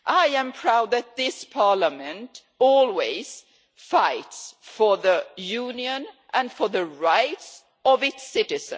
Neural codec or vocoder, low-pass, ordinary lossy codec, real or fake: none; none; none; real